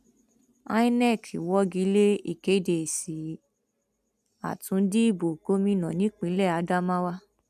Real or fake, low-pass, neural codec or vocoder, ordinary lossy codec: real; 14.4 kHz; none; none